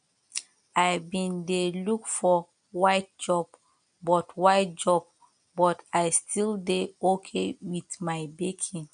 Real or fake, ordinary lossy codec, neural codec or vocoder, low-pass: real; MP3, 64 kbps; none; 9.9 kHz